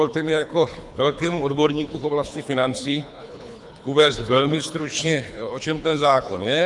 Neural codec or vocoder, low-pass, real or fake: codec, 24 kHz, 3 kbps, HILCodec; 10.8 kHz; fake